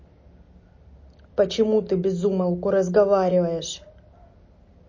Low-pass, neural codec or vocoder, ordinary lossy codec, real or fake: 7.2 kHz; none; MP3, 32 kbps; real